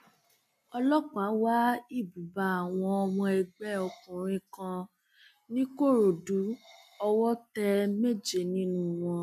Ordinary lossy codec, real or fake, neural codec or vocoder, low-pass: none; real; none; 14.4 kHz